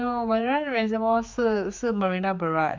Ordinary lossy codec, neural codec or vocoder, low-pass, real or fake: MP3, 64 kbps; codec, 16 kHz, 4 kbps, X-Codec, HuBERT features, trained on general audio; 7.2 kHz; fake